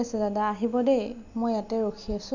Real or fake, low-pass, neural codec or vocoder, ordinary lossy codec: real; 7.2 kHz; none; none